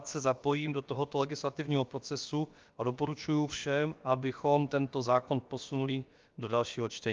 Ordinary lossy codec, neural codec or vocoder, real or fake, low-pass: Opus, 32 kbps; codec, 16 kHz, about 1 kbps, DyCAST, with the encoder's durations; fake; 7.2 kHz